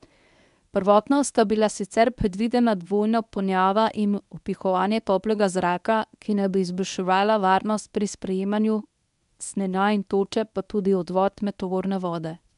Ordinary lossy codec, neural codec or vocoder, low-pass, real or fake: none; codec, 24 kHz, 0.9 kbps, WavTokenizer, medium speech release version 1; 10.8 kHz; fake